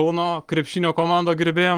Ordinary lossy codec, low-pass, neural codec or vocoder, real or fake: Opus, 24 kbps; 19.8 kHz; vocoder, 44.1 kHz, 128 mel bands every 256 samples, BigVGAN v2; fake